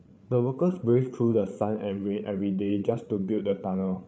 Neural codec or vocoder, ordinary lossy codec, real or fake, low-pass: codec, 16 kHz, 8 kbps, FreqCodec, larger model; none; fake; none